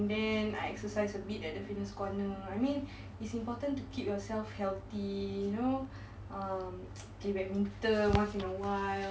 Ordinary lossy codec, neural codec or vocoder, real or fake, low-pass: none; none; real; none